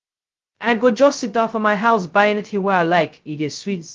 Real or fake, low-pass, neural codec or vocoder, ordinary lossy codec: fake; 7.2 kHz; codec, 16 kHz, 0.2 kbps, FocalCodec; Opus, 32 kbps